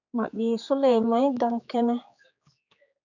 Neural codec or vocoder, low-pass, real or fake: codec, 16 kHz, 4 kbps, X-Codec, HuBERT features, trained on general audio; 7.2 kHz; fake